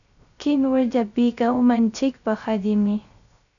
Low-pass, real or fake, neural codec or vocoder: 7.2 kHz; fake; codec, 16 kHz, 0.3 kbps, FocalCodec